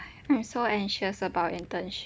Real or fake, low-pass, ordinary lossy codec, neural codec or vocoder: real; none; none; none